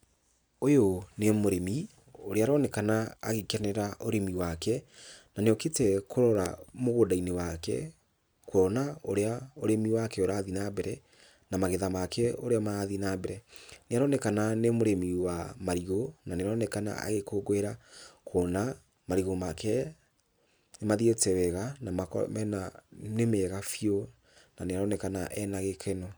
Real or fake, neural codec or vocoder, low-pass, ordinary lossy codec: real; none; none; none